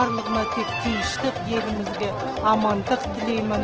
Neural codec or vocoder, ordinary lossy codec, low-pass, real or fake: none; Opus, 16 kbps; 7.2 kHz; real